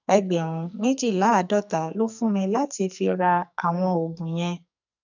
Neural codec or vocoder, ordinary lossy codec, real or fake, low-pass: codec, 44.1 kHz, 2.6 kbps, SNAC; none; fake; 7.2 kHz